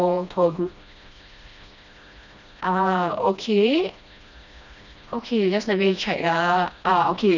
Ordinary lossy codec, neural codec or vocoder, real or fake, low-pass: none; codec, 16 kHz, 1 kbps, FreqCodec, smaller model; fake; 7.2 kHz